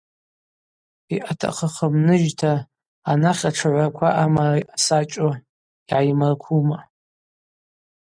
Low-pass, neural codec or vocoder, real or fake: 9.9 kHz; none; real